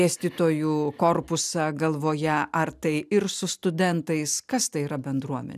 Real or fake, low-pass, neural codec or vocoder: real; 14.4 kHz; none